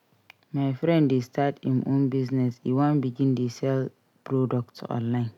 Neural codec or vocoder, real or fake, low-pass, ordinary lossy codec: none; real; 19.8 kHz; none